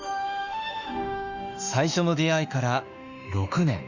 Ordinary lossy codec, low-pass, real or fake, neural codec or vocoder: Opus, 64 kbps; 7.2 kHz; fake; autoencoder, 48 kHz, 32 numbers a frame, DAC-VAE, trained on Japanese speech